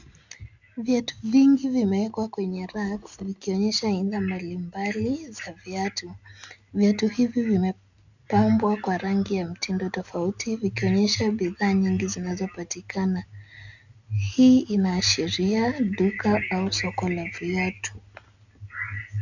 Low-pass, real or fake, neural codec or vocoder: 7.2 kHz; real; none